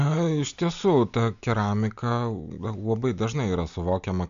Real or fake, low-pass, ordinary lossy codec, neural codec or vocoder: real; 7.2 kHz; AAC, 96 kbps; none